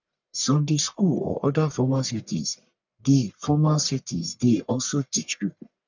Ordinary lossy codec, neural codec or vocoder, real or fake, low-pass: none; codec, 44.1 kHz, 1.7 kbps, Pupu-Codec; fake; 7.2 kHz